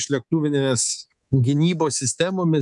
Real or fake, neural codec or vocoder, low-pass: fake; codec, 24 kHz, 3.1 kbps, DualCodec; 10.8 kHz